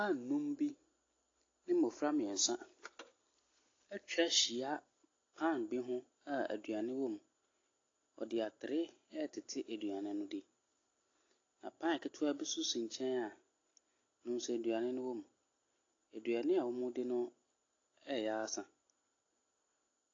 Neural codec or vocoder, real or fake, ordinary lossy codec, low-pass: none; real; AAC, 32 kbps; 7.2 kHz